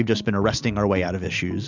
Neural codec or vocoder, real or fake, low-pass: none; real; 7.2 kHz